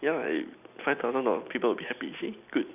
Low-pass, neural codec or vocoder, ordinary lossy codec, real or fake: 3.6 kHz; none; none; real